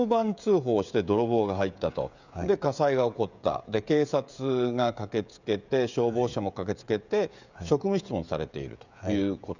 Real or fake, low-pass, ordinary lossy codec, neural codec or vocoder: fake; 7.2 kHz; none; codec, 16 kHz, 16 kbps, FreqCodec, smaller model